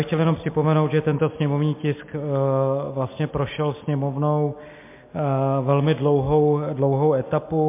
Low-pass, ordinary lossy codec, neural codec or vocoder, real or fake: 3.6 kHz; MP3, 24 kbps; none; real